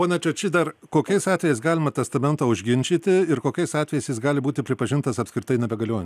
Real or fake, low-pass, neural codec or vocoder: real; 14.4 kHz; none